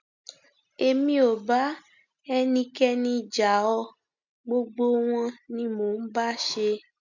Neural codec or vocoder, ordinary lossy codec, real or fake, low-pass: none; none; real; 7.2 kHz